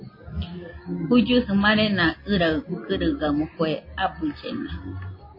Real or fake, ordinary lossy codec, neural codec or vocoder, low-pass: real; MP3, 24 kbps; none; 5.4 kHz